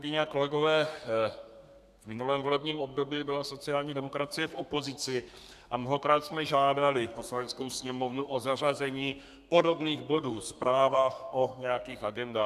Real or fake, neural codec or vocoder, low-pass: fake; codec, 32 kHz, 1.9 kbps, SNAC; 14.4 kHz